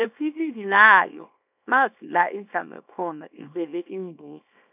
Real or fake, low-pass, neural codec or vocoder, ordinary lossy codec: fake; 3.6 kHz; codec, 24 kHz, 0.9 kbps, WavTokenizer, small release; none